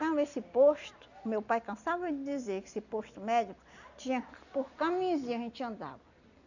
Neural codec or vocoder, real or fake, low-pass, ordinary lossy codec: none; real; 7.2 kHz; none